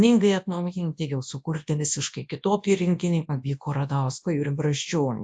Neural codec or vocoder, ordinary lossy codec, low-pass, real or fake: codec, 24 kHz, 0.9 kbps, WavTokenizer, large speech release; AAC, 64 kbps; 9.9 kHz; fake